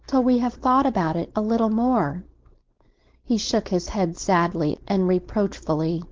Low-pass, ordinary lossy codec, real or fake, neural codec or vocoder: 7.2 kHz; Opus, 16 kbps; fake; codec, 16 kHz, 4.8 kbps, FACodec